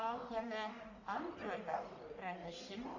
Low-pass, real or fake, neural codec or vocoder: 7.2 kHz; fake; codec, 44.1 kHz, 1.7 kbps, Pupu-Codec